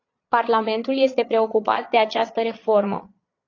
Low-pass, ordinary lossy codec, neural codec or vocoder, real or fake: 7.2 kHz; AAC, 32 kbps; vocoder, 22.05 kHz, 80 mel bands, Vocos; fake